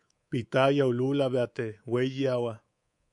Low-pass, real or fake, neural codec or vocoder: 10.8 kHz; fake; codec, 24 kHz, 3.1 kbps, DualCodec